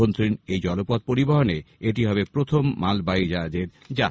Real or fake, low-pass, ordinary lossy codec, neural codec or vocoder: real; none; none; none